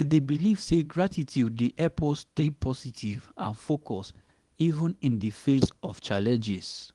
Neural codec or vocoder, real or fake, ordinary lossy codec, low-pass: codec, 24 kHz, 0.9 kbps, WavTokenizer, small release; fake; Opus, 24 kbps; 10.8 kHz